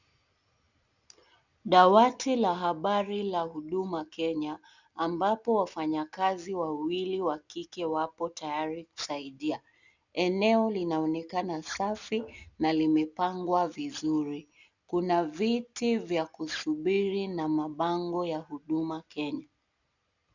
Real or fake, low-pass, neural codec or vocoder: real; 7.2 kHz; none